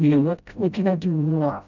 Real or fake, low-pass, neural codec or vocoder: fake; 7.2 kHz; codec, 16 kHz, 0.5 kbps, FreqCodec, smaller model